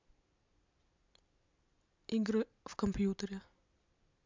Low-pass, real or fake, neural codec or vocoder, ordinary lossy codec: 7.2 kHz; real; none; MP3, 64 kbps